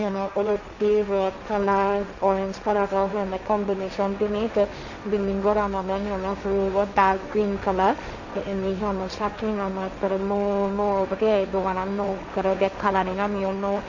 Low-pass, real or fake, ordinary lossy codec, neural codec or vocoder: 7.2 kHz; fake; none; codec, 16 kHz, 1.1 kbps, Voila-Tokenizer